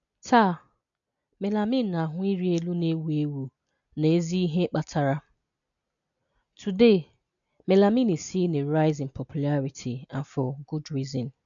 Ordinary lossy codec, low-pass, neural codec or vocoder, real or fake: none; 7.2 kHz; none; real